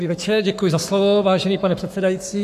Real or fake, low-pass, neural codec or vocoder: fake; 14.4 kHz; codec, 44.1 kHz, 7.8 kbps, Pupu-Codec